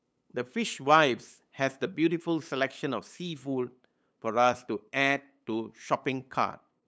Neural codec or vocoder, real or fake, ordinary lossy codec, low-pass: codec, 16 kHz, 8 kbps, FunCodec, trained on LibriTTS, 25 frames a second; fake; none; none